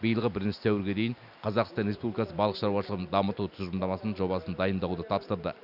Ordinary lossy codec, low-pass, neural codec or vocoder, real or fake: none; 5.4 kHz; none; real